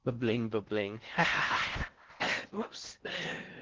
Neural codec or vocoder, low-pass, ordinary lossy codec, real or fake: codec, 16 kHz in and 24 kHz out, 0.6 kbps, FocalCodec, streaming, 4096 codes; 7.2 kHz; Opus, 16 kbps; fake